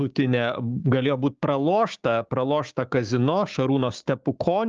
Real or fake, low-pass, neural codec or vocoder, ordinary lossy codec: fake; 7.2 kHz; codec, 16 kHz, 6 kbps, DAC; Opus, 24 kbps